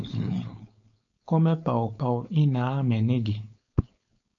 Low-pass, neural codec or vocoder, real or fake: 7.2 kHz; codec, 16 kHz, 4.8 kbps, FACodec; fake